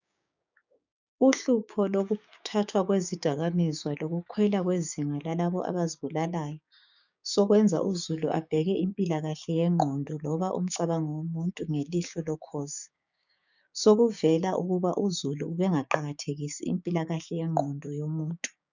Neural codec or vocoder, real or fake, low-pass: codec, 16 kHz, 6 kbps, DAC; fake; 7.2 kHz